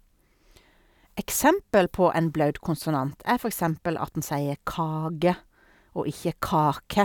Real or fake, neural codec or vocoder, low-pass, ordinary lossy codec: real; none; 19.8 kHz; none